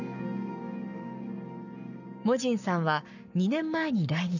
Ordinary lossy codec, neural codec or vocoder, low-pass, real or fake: none; codec, 44.1 kHz, 7.8 kbps, Pupu-Codec; 7.2 kHz; fake